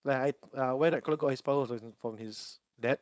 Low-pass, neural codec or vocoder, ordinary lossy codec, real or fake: none; codec, 16 kHz, 4.8 kbps, FACodec; none; fake